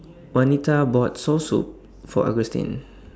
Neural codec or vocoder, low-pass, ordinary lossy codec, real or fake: none; none; none; real